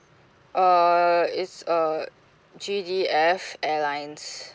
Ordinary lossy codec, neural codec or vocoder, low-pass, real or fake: none; none; none; real